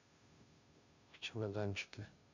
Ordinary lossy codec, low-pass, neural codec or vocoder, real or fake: none; 7.2 kHz; codec, 16 kHz, 0.5 kbps, FunCodec, trained on Chinese and English, 25 frames a second; fake